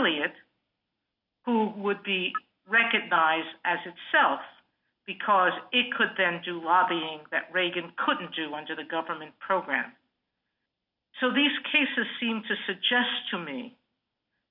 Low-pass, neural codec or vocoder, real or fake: 5.4 kHz; none; real